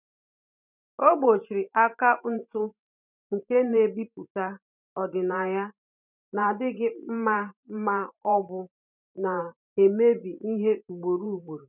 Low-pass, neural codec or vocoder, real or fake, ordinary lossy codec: 3.6 kHz; vocoder, 44.1 kHz, 128 mel bands every 512 samples, BigVGAN v2; fake; none